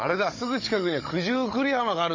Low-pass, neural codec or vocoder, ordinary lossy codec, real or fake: 7.2 kHz; codec, 16 kHz, 16 kbps, FunCodec, trained on Chinese and English, 50 frames a second; MP3, 32 kbps; fake